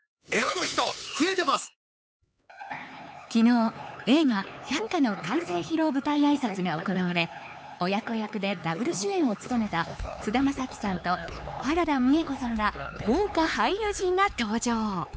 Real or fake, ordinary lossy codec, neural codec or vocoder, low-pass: fake; none; codec, 16 kHz, 4 kbps, X-Codec, HuBERT features, trained on LibriSpeech; none